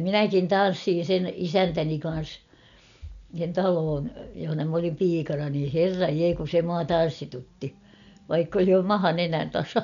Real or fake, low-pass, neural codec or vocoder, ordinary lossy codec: real; 7.2 kHz; none; none